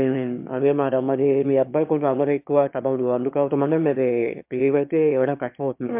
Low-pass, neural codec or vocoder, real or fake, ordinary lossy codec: 3.6 kHz; autoencoder, 22.05 kHz, a latent of 192 numbers a frame, VITS, trained on one speaker; fake; MP3, 32 kbps